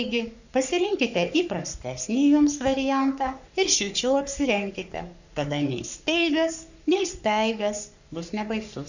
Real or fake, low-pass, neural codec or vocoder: fake; 7.2 kHz; codec, 44.1 kHz, 3.4 kbps, Pupu-Codec